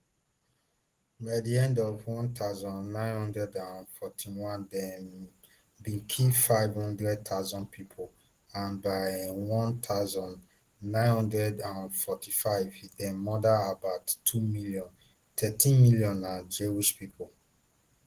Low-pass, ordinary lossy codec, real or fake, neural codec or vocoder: 14.4 kHz; Opus, 16 kbps; real; none